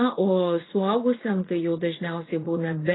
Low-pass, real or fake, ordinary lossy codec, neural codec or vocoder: 7.2 kHz; fake; AAC, 16 kbps; vocoder, 44.1 kHz, 80 mel bands, Vocos